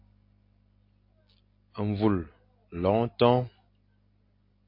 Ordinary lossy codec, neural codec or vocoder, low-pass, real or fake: MP3, 32 kbps; none; 5.4 kHz; real